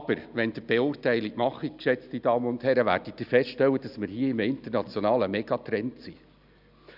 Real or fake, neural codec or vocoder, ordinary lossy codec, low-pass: real; none; none; 5.4 kHz